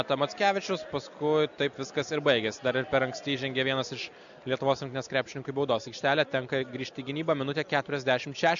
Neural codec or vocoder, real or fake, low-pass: none; real; 7.2 kHz